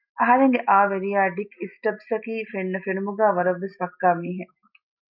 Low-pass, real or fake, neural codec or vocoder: 5.4 kHz; real; none